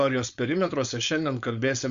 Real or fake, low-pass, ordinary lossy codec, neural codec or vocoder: fake; 7.2 kHz; Opus, 64 kbps; codec, 16 kHz, 4.8 kbps, FACodec